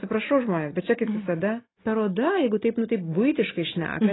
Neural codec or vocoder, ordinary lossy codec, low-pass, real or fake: none; AAC, 16 kbps; 7.2 kHz; real